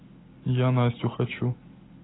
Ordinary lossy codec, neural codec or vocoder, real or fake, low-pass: AAC, 16 kbps; none; real; 7.2 kHz